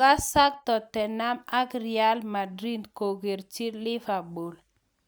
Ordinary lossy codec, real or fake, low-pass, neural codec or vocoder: none; real; none; none